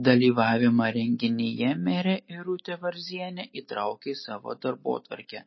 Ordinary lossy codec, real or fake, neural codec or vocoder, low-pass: MP3, 24 kbps; real; none; 7.2 kHz